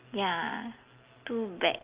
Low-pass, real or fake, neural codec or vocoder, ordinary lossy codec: 3.6 kHz; real; none; Opus, 32 kbps